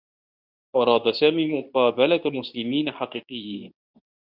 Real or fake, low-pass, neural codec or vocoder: fake; 5.4 kHz; codec, 24 kHz, 0.9 kbps, WavTokenizer, medium speech release version 2